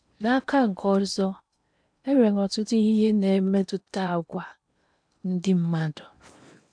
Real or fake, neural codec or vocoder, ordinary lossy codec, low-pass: fake; codec, 16 kHz in and 24 kHz out, 0.8 kbps, FocalCodec, streaming, 65536 codes; none; 9.9 kHz